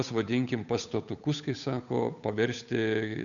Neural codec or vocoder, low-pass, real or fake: codec, 16 kHz, 8 kbps, FunCodec, trained on Chinese and English, 25 frames a second; 7.2 kHz; fake